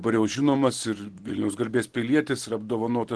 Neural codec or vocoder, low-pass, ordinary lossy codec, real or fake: none; 10.8 kHz; Opus, 16 kbps; real